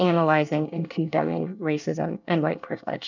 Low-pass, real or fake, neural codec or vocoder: 7.2 kHz; fake; codec, 24 kHz, 1 kbps, SNAC